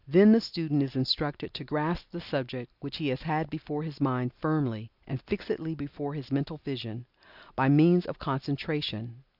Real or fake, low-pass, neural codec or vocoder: real; 5.4 kHz; none